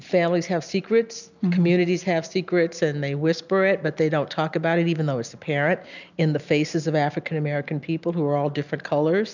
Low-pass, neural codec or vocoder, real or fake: 7.2 kHz; none; real